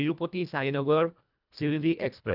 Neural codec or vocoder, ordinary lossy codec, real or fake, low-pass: codec, 24 kHz, 1.5 kbps, HILCodec; none; fake; 5.4 kHz